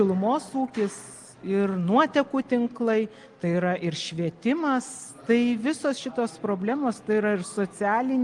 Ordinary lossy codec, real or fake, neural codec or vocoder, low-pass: Opus, 24 kbps; real; none; 10.8 kHz